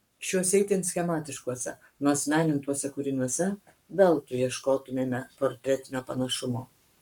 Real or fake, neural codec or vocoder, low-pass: fake; codec, 44.1 kHz, 7.8 kbps, Pupu-Codec; 19.8 kHz